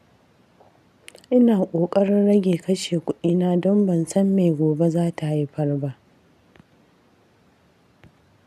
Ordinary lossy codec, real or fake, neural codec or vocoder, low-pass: none; real; none; 14.4 kHz